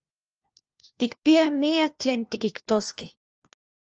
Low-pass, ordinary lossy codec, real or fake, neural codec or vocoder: 7.2 kHz; Opus, 24 kbps; fake; codec, 16 kHz, 1 kbps, FunCodec, trained on LibriTTS, 50 frames a second